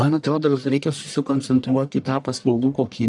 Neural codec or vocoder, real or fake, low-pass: codec, 44.1 kHz, 1.7 kbps, Pupu-Codec; fake; 10.8 kHz